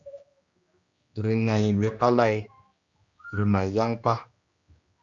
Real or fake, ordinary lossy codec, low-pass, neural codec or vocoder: fake; Opus, 64 kbps; 7.2 kHz; codec, 16 kHz, 1 kbps, X-Codec, HuBERT features, trained on general audio